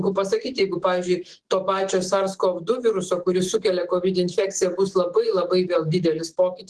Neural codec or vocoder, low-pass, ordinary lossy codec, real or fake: autoencoder, 48 kHz, 128 numbers a frame, DAC-VAE, trained on Japanese speech; 10.8 kHz; Opus, 16 kbps; fake